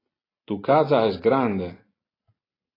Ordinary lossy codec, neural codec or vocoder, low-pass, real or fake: AAC, 24 kbps; none; 5.4 kHz; real